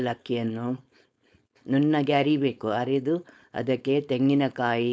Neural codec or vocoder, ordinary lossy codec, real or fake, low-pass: codec, 16 kHz, 4.8 kbps, FACodec; none; fake; none